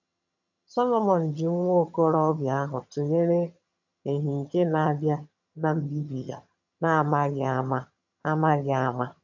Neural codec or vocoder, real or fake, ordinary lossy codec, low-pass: vocoder, 22.05 kHz, 80 mel bands, HiFi-GAN; fake; none; 7.2 kHz